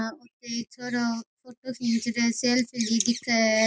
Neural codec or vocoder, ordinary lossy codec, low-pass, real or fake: none; none; none; real